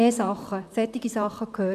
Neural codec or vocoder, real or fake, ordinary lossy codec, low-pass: vocoder, 44.1 kHz, 128 mel bands, Pupu-Vocoder; fake; AAC, 96 kbps; 14.4 kHz